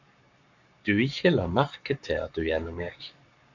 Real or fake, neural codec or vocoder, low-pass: fake; codec, 44.1 kHz, 7.8 kbps, Pupu-Codec; 7.2 kHz